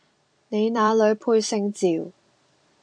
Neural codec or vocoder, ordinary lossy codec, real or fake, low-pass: vocoder, 44.1 kHz, 128 mel bands every 256 samples, BigVGAN v2; AAC, 64 kbps; fake; 9.9 kHz